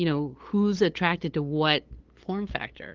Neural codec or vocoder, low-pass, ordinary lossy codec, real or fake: codec, 16 kHz, 8 kbps, FunCodec, trained on LibriTTS, 25 frames a second; 7.2 kHz; Opus, 16 kbps; fake